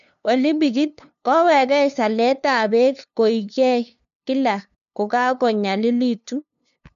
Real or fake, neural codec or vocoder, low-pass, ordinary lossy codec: fake; codec, 16 kHz, 2 kbps, FunCodec, trained on LibriTTS, 25 frames a second; 7.2 kHz; none